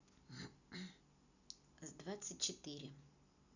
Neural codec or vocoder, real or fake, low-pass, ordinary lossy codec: none; real; 7.2 kHz; none